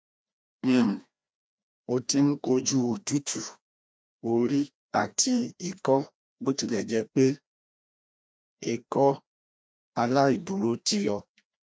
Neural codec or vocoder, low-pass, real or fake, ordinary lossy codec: codec, 16 kHz, 1 kbps, FreqCodec, larger model; none; fake; none